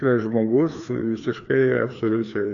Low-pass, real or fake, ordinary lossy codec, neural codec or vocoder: 7.2 kHz; fake; AAC, 64 kbps; codec, 16 kHz, 2 kbps, FreqCodec, larger model